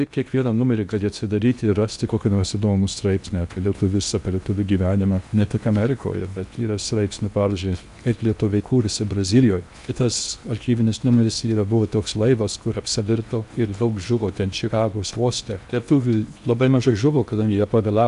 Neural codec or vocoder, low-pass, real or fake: codec, 16 kHz in and 24 kHz out, 0.8 kbps, FocalCodec, streaming, 65536 codes; 10.8 kHz; fake